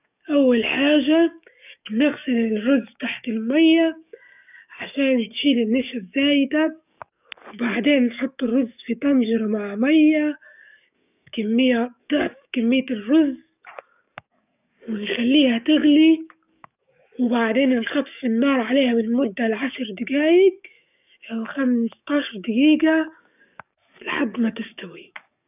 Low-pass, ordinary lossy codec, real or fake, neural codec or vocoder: 3.6 kHz; none; fake; codec, 44.1 kHz, 7.8 kbps, DAC